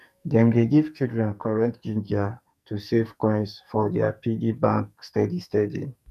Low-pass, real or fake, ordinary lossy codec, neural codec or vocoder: 14.4 kHz; fake; none; codec, 44.1 kHz, 2.6 kbps, SNAC